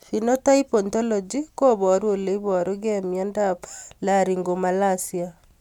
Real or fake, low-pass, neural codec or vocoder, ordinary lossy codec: real; 19.8 kHz; none; none